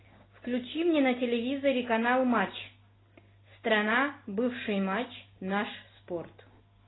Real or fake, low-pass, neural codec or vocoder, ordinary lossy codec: real; 7.2 kHz; none; AAC, 16 kbps